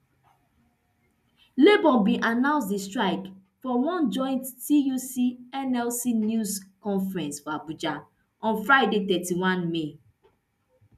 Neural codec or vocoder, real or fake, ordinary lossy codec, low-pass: none; real; none; 14.4 kHz